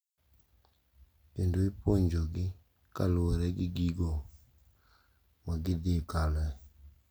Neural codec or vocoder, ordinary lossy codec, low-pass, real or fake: none; none; none; real